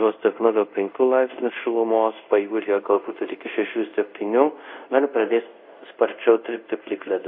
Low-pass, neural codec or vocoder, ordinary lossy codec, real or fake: 5.4 kHz; codec, 24 kHz, 0.5 kbps, DualCodec; MP3, 32 kbps; fake